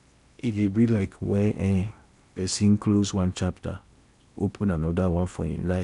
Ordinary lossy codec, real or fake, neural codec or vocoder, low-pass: none; fake; codec, 16 kHz in and 24 kHz out, 0.8 kbps, FocalCodec, streaming, 65536 codes; 10.8 kHz